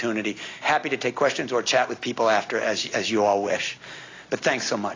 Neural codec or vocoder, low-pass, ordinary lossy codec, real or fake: none; 7.2 kHz; AAC, 32 kbps; real